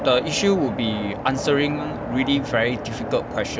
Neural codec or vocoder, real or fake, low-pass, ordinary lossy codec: none; real; none; none